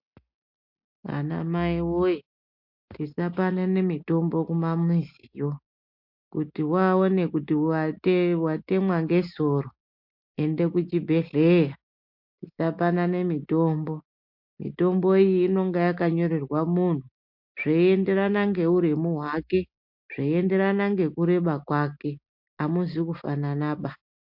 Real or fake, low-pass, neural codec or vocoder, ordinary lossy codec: real; 5.4 kHz; none; MP3, 48 kbps